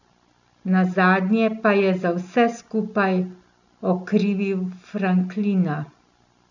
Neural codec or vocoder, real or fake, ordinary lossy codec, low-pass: none; real; none; 7.2 kHz